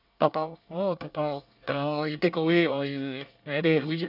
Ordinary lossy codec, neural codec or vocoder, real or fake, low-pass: none; codec, 24 kHz, 1 kbps, SNAC; fake; 5.4 kHz